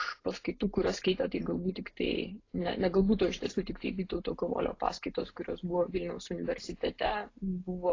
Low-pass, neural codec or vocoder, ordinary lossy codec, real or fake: 7.2 kHz; none; AAC, 32 kbps; real